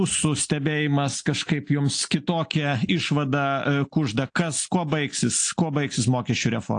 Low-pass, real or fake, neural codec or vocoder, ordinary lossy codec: 9.9 kHz; real; none; AAC, 48 kbps